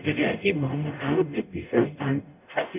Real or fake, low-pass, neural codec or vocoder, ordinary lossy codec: fake; 3.6 kHz; codec, 44.1 kHz, 0.9 kbps, DAC; none